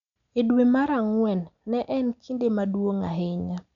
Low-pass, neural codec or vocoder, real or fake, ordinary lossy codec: 7.2 kHz; none; real; none